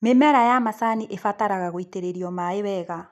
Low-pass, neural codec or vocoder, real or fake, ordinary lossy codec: 14.4 kHz; none; real; none